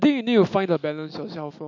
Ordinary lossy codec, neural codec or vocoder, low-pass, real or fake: AAC, 48 kbps; none; 7.2 kHz; real